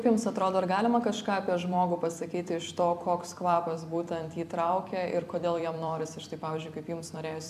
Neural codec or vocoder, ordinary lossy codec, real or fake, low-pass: vocoder, 44.1 kHz, 128 mel bands every 256 samples, BigVGAN v2; AAC, 96 kbps; fake; 14.4 kHz